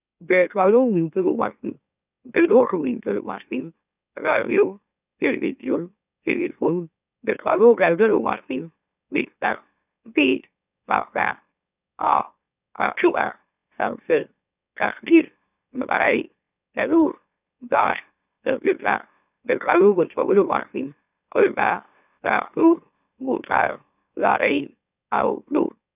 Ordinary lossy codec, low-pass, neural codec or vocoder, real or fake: none; 3.6 kHz; autoencoder, 44.1 kHz, a latent of 192 numbers a frame, MeloTTS; fake